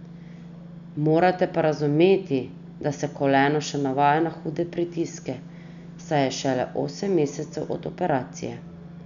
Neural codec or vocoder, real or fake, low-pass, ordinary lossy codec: none; real; 7.2 kHz; none